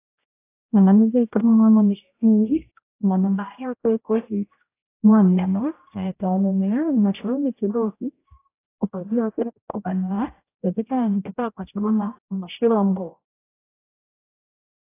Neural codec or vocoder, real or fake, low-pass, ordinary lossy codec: codec, 16 kHz, 0.5 kbps, X-Codec, HuBERT features, trained on general audio; fake; 3.6 kHz; AAC, 24 kbps